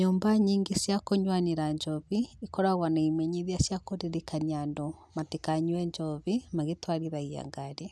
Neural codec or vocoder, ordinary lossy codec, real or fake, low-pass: none; none; real; none